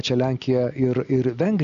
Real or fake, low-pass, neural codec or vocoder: real; 7.2 kHz; none